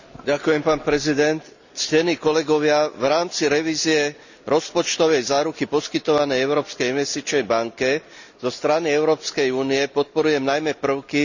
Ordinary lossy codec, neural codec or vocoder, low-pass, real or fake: none; none; 7.2 kHz; real